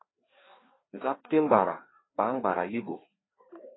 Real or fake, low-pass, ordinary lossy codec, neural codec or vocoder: fake; 7.2 kHz; AAC, 16 kbps; codec, 16 kHz, 2 kbps, FreqCodec, larger model